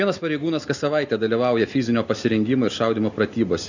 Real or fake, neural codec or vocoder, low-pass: real; none; 7.2 kHz